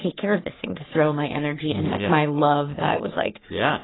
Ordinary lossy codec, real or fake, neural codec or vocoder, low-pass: AAC, 16 kbps; fake; codec, 44.1 kHz, 3.4 kbps, Pupu-Codec; 7.2 kHz